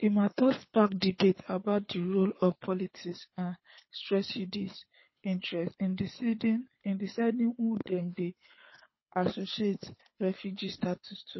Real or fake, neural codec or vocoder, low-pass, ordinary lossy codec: fake; codec, 24 kHz, 6 kbps, HILCodec; 7.2 kHz; MP3, 24 kbps